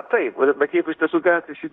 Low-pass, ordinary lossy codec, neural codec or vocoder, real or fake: 10.8 kHz; Opus, 64 kbps; codec, 16 kHz in and 24 kHz out, 0.9 kbps, LongCat-Audio-Codec, fine tuned four codebook decoder; fake